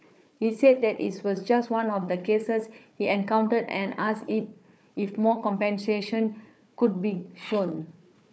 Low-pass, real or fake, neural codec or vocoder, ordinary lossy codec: none; fake; codec, 16 kHz, 4 kbps, FunCodec, trained on Chinese and English, 50 frames a second; none